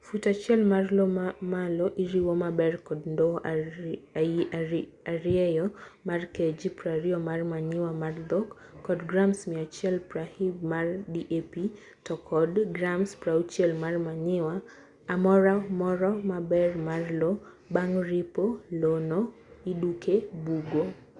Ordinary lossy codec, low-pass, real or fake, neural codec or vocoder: Opus, 64 kbps; 10.8 kHz; real; none